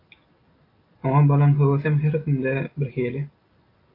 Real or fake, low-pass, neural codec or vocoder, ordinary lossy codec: real; 5.4 kHz; none; AAC, 32 kbps